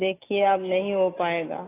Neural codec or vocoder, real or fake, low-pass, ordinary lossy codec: none; real; 3.6 kHz; AAC, 16 kbps